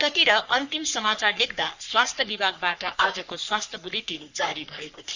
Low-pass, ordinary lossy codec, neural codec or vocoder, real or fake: 7.2 kHz; Opus, 64 kbps; codec, 44.1 kHz, 3.4 kbps, Pupu-Codec; fake